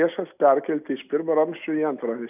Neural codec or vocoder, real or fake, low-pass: none; real; 3.6 kHz